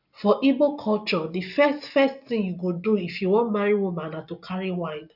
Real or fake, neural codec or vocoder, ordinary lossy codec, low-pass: real; none; none; 5.4 kHz